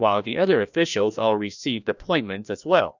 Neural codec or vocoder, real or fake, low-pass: codec, 16 kHz, 1 kbps, FreqCodec, larger model; fake; 7.2 kHz